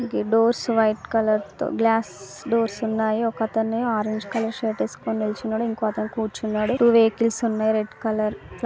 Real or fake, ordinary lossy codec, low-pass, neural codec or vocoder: real; none; none; none